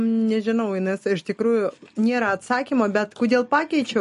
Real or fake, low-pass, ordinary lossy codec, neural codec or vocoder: real; 14.4 kHz; MP3, 48 kbps; none